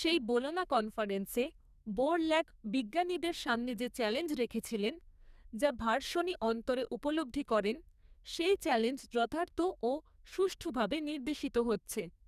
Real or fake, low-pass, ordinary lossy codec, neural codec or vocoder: fake; 14.4 kHz; none; codec, 44.1 kHz, 2.6 kbps, SNAC